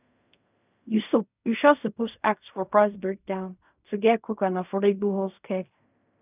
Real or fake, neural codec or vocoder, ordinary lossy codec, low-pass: fake; codec, 16 kHz in and 24 kHz out, 0.4 kbps, LongCat-Audio-Codec, fine tuned four codebook decoder; none; 3.6 kHz